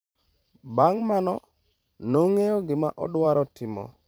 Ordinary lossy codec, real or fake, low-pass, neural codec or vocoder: none; fake; none; vocoder, 44.1 kHz, 128 mel bands every 512 samples, BigVGAN v2